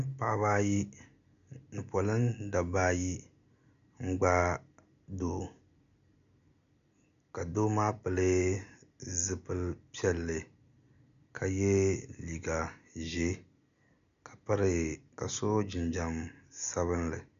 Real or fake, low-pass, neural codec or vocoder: real; 7.2 kHz; none